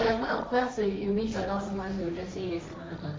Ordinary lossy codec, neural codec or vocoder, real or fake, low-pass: none; codec, 16 kHz, 1.1 kbps, Voila-Tokenizer; fake; none